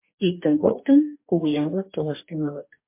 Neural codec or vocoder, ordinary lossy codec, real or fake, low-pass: codec, 44.1 kHz, 2.6 kbps, DAC; MP3, 24 kbps; fake; 3.6 kHz